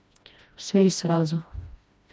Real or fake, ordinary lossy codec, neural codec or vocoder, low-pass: fake; none; codec, 16 kHz, 1 kbps, FreqCodec, smaller model; none